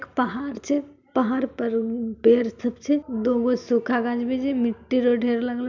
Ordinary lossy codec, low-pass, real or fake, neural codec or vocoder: none; 7.2 kHz; real; none